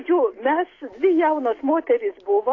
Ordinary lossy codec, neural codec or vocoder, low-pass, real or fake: AAC, 32 kbps; none; 7.2 kHz; real